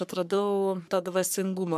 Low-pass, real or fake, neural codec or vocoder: 14.4 kHz; fake; codec, 44.1 kHz, 3.4 kbps, Pupu-Codec